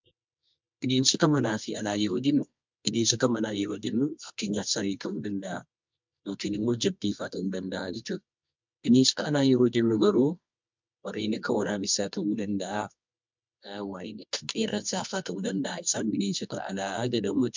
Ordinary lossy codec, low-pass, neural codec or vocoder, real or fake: MP3, 64 kbps; 7.2 kHz; codec, 24 kHz, 0.9 kbps, WavTokenizer, medium music audio release; fake